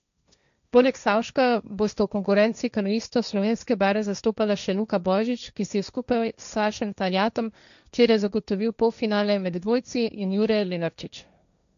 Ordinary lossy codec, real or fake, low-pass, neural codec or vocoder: none; fake; 7.2 kHz; codec, 16 kHz, 1.1 kbps, Voila-Tokenizer